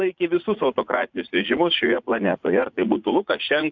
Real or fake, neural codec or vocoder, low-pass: fake; vocoder, 44.1 kHz, 80 mel bands, Vocos; 7.2 kHz